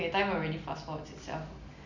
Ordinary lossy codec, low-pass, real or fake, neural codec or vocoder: none; 7.2 kHz; real; none